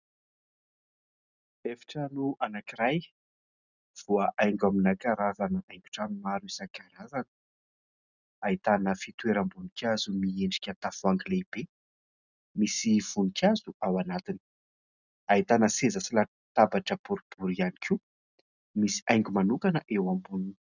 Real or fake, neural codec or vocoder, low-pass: real; none; 7.2 kHz